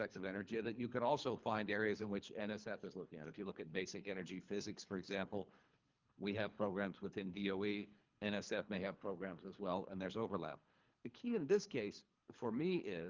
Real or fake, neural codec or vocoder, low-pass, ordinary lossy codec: fake; codec, 24 kHz, 3 kbps, HILCodec; 7.2 kHz; Opus, 24 kbps